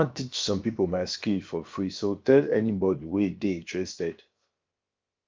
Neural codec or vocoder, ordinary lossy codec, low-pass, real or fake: codec, 16 kHz, about 1 kbps, DyCAST, with the encoder's durations; Opus, 24 kbps; 7.2 kHz; fake